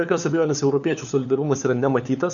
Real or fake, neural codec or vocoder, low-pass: fake; codec, 16 kHz, 4 kbps, FunCodec, trained on LibriTTS, 50 frames a second; 7.2 kHz